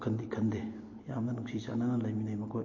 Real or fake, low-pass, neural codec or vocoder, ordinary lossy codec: real; 7.2 kHz; none; MP3, 32 kbps